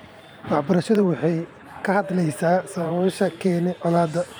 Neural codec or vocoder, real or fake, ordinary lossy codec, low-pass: vocoder, 44.1 kHz, 128 mel bands every 512 samples, BigVGAN v2; fake; none; none